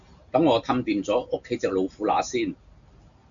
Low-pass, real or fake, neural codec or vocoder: 7.2 kHz; real; none